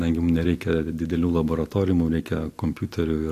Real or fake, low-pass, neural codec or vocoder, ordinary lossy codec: real; 14.4 kHz; none; AAC, 64 kbps